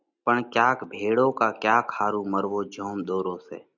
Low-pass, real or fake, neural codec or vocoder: 7.2 kHz; real; none